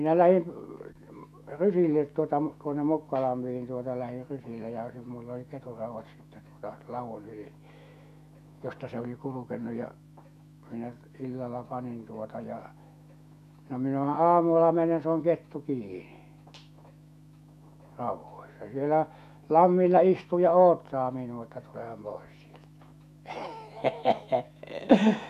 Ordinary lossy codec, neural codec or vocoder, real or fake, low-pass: MP3, 96 kbps; autoencoder, 48 kHz, 128 numbers a frame, DAC-VAE, trained on Japanese speech; fake; 14.4 kHz